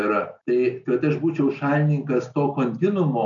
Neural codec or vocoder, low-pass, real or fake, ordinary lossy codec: none; 7.2 kHz; real; MP3, 96 kbps